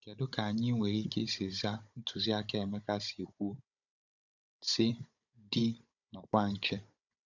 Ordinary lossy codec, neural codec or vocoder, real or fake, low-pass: none; codec, 16 kHz, 16 kbps, FunCodec, trained on Chinese and English, 50 frames a second; fake; 7.2 kHz